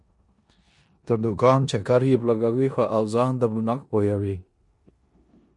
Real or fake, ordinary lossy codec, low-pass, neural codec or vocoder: fake; MP3, 48 kbps; 10.8 kHz; codec, 16 kHz in and 24 kHz out, 0.9 kbps, LongCat-Audio-Codec, four codebook decoder